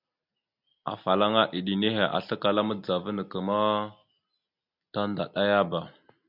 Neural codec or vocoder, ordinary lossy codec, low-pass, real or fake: none; MP3, 48 kbps; 5.4 kHz; real